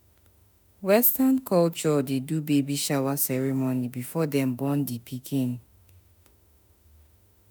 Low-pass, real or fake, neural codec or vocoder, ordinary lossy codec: none; fake; autoencoder, 48 kHz, 32 numbers a frame, DAC-VAE, trained on Japanese speech; none